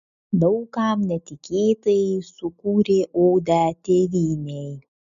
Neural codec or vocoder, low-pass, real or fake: none; 7.2 kHz; real